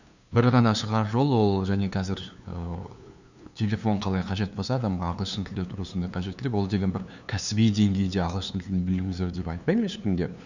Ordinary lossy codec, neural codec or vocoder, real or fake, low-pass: none; codec, 16 kHz, 2 kbps, FunCodec, trained on LibriTTS, 25 frames a second; fake; 7.2 kHz